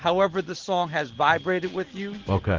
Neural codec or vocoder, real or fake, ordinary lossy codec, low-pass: none; real; Opus, 16 kbps; 7.2 kHz